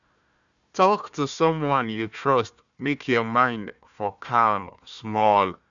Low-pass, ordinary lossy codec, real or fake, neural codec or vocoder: 7.2 kHz; none; fake; codec, 16 kHz, 1 kbps, FunCodec, trained on Chinese and English, 50 frames a second